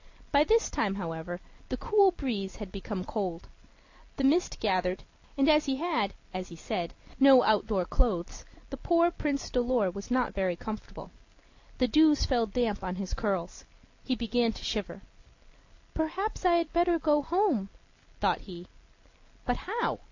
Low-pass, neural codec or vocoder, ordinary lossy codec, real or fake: 7.2 kHz; none; AAC, 48 kbps; real